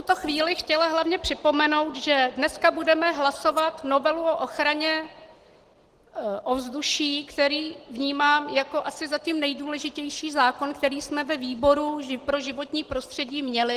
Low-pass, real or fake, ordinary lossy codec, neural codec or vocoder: 14.4 kHz; real; Opus, 16 kbps; none